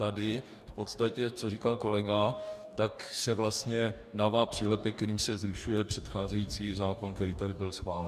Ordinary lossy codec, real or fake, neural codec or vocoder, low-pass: MP3, 96 kbps; fake; codec, 44.1 kHz, 2.6 kbps, DAC; 14.4 kHz